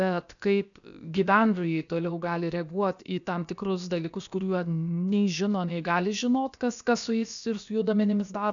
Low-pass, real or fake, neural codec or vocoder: 7.2 kHz; fake; codec, 16 kHz, about 1 kbps, DyCAST, with the encoder's durations